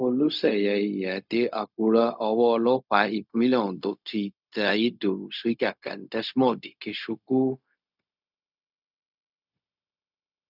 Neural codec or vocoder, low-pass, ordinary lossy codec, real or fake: codec, 16 kHz, 0.4 kbps, LongCat-Audio-Codec; 5.4 kHz; none; fake